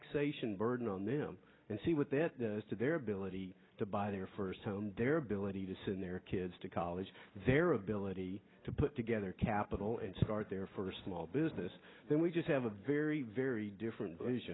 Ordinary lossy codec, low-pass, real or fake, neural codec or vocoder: AAC, 16 kbps; 7.2 kHz; real; none